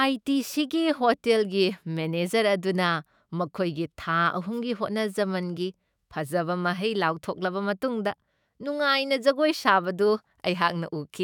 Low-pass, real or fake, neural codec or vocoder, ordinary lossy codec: 19.8 kHz; fake; autoencoder, 48 kHz, 128 numbers a frame, DAC-VAE, trained on Japanese speech; none